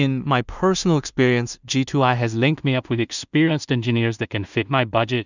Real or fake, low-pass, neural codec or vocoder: fake; 7.2 kHz; codec, 16 kHz in and 24 kHz out, 0.4 kbps, LongCat-Audio-Codec, two codebook decoder